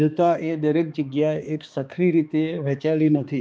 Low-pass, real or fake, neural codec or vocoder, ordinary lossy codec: none; fake; codec, 16 kHz, 2 kbps, X-Codec, HuBERT features, trained on balanced general audio; none